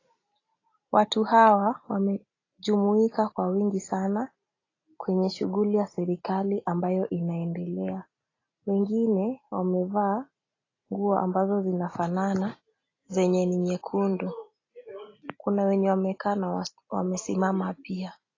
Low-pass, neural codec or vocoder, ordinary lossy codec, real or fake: 7.2 kHz; none; AAC, 32 kbps; real